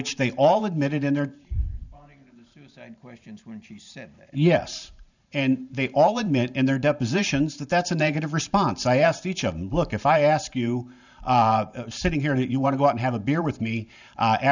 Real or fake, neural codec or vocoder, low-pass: fake; vocoder, 44.1 kHz, 128 mel bands every 512 samples, BigVGAN v2; 7.2 kHz